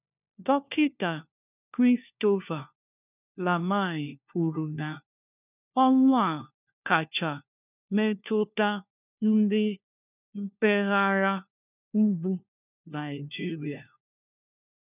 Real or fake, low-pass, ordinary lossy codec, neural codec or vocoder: fake; 3.6 kHz; none; codec, 16 kHz, 1 kbps, FunCodec, trained on LibriTTS, 50 frames a second